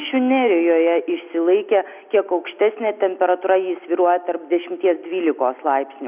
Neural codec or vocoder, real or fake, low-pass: none; real; 3.6 kHz